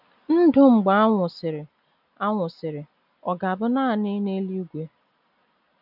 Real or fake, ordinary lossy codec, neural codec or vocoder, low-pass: real; none; none; 5.4 kHz